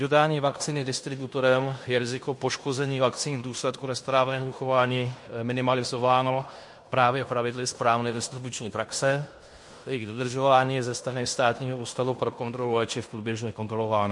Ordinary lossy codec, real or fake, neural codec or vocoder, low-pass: MP3, 48 kbps; fake; codec, 16 kHz in and 24 kHz out, 0.9 kbps, LongCat-Audio-Codec, fine tuned four codebook decoder; 10.8 kHz